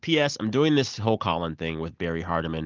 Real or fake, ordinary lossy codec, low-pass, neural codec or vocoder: real; Opus, 24 kbps; 7.2 kHz; none